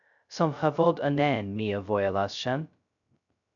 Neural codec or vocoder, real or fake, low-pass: codec, 16 kHz, 0.2 kbps, FocalCodec; fake; 7.2 kHz